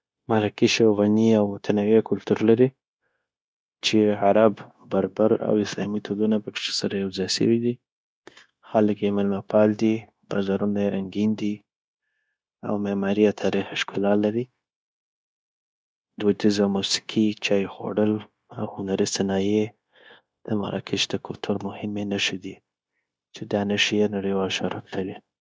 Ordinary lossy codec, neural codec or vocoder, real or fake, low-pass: none; codec, 16 kHz, 0.9 kbps, LongCat-Audio-Codec; fake; none